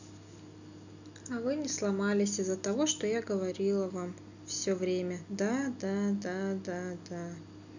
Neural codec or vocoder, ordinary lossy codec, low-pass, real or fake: none; none; 7.2 kHz; real